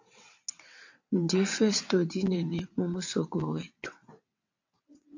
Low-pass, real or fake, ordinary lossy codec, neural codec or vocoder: 7.2 kHz; fake; AAC, 48 kbps; vocoder, 22.05 kHz, 80 mel bands, WaveNeXt